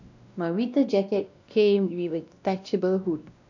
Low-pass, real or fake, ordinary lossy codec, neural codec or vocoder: 7.2 kHz; fake; none; codec, 16 kHz, 1 kbps, X-Codec, WavLM features, trained on Multilingual LibriSpeech